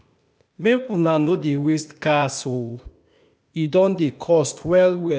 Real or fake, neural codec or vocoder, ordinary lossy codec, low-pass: fake; codec, 16 kHz, 0.8 kbps, ZipCodec; none; none